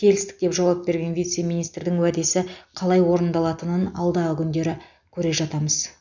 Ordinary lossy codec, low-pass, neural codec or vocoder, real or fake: none; 7.2 kHz; none; real